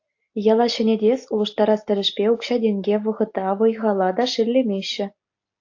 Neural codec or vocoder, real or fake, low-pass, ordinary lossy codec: none; real; 7.2 kHz; AAC, 48 kbps